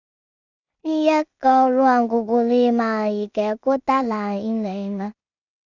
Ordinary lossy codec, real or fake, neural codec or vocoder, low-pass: none; fake; codec, 16 kHz in and 24 kHz out, 0.4 kbps, LongCat-Audio-Codec, two codebook decoder; 7.2 kHz